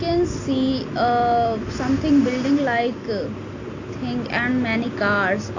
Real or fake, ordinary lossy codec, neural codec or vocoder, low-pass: real; AAC, 48 kbps; none; 7.2 kHz